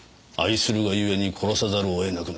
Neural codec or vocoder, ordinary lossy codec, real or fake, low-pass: none; none; real; none